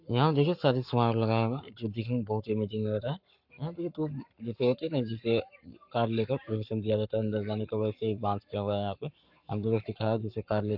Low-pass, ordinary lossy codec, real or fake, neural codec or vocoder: 5.4 kHz; MP3, 48 kbps; fake; codec, 44.1 kHz, 7.8 kbps, Pupu-Codec